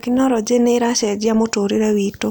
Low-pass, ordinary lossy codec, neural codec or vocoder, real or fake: none; none; none; real